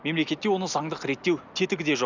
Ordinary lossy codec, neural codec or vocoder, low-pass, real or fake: none; none; 7.2 kHz; real